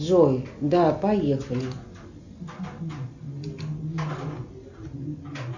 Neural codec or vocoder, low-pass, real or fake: none; 7.2 kHz; real